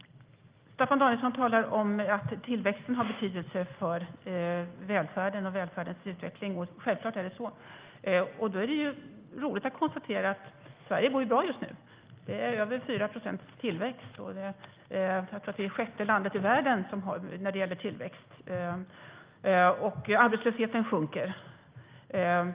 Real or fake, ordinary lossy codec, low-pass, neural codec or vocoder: real; Opus, 32 kbps; 3.6 kHz; none